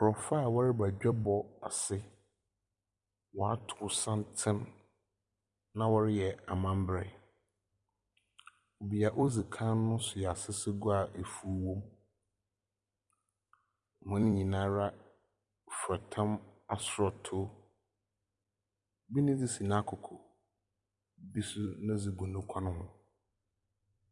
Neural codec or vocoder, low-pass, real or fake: vocoder, 44.1 kHz, 128 mel bands every 256 samples, BigVGAN v2; 10.8 kHz; fake